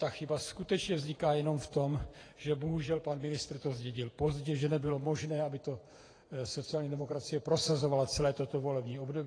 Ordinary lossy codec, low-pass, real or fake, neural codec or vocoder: AAC, 32 kbps; 9.9 kHz; fake; vocoder, 48 kHz, 128 mel bands, Vocos